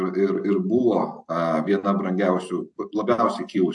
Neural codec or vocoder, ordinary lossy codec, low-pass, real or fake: none; AAC, 64 kbps; 10.8 kHz; real